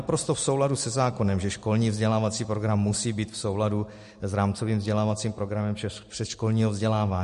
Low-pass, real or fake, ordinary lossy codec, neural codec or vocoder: 14.4 kHz; real; MP3, 48 kbps; none